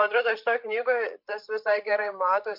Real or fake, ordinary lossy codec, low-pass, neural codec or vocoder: fake; MP3, 48 kbps; 5.4 kHz; codec, 16 kHz, 8 kbps, FreqCodec, larger model